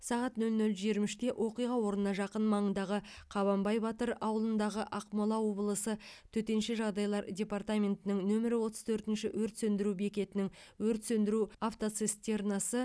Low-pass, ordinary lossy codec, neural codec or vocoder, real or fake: none; none; none; real